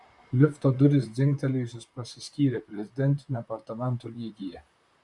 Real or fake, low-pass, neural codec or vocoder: fake; 10.8 kHz; vocoder, 44.1 kHz, 128 mel bands, Pupu-Vocoder